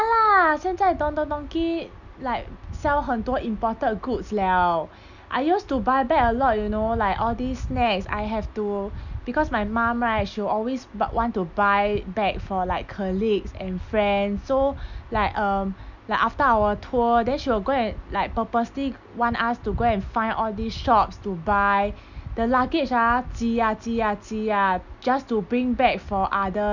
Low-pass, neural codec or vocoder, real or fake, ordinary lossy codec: 7.2 kHz; none; real; none